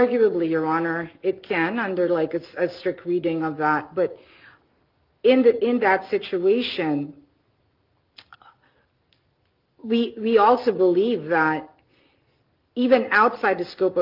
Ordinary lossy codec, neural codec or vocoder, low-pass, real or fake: Opus, 32 kbps; none; 5.4 kHz; real